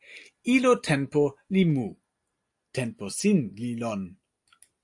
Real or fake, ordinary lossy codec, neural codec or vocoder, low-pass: real; AAC, 64 kbps; none; 10.8 kHz